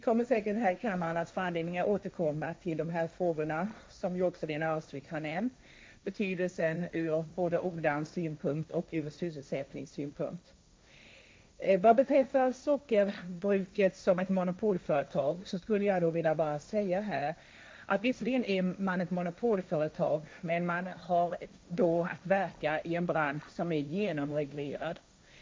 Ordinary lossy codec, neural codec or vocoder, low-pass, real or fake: none; codec, 16 kHz, 1.1 kbps, Voila-Tokenizer; none; fake